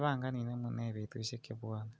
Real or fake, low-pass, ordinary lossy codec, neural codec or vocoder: real; none; none; none